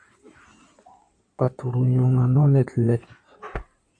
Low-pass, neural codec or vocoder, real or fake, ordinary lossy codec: 9.9 kHz; codec, 16 kHz in and 24 kHz out, 2.2 kbps, FireRedTTS-2 codec; fake; MP3, 48 kbps